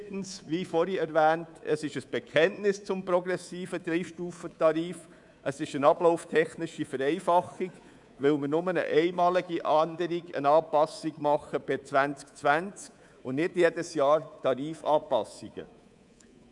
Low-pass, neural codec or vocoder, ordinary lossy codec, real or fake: 10.8 kHz; codec, 24 kHz, 3.1 kbps, DualCodec; none; fake